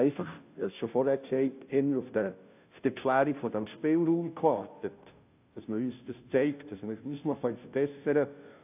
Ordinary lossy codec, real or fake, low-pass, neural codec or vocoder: AAC, 32 kbps; fake; 3.6 kHz; codec, 16 kHz, 0.5 kbps, FunCodec, trained on Chinese and English, 25 frames a second